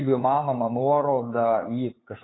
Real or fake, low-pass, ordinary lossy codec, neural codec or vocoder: fake; 7.2 kHz; AAC, 16 kbps; codec, 16 kHz, 2 kbps, FunCodec, trained on LibriTTS, 25 frames a second